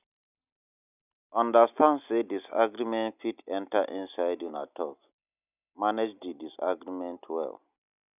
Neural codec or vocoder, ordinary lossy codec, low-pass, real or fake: none; none; 3.6 kHz; real